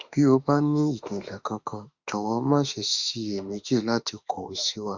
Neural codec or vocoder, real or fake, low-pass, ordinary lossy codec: autoencoder, 48 kHz, 32 numbers a frame, DAC-VAE, trained on Japanese speech; fake; 7.2 kHz; Opus, 64 kbps